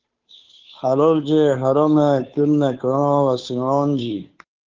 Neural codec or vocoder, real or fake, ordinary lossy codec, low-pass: codec, 16 kHz, 2 kbps, FunCodec, trained on Chinese and English, 25 frames a second; fake; Opus, 32 kbps; 7.2 kHz